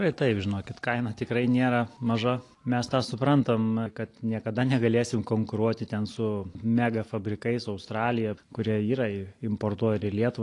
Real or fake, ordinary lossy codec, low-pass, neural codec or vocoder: real; AAC, 48 kbps; 10.8 kHz; none